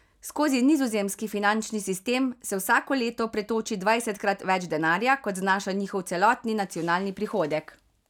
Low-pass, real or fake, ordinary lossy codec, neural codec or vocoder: 19.8 kHz; real; none; none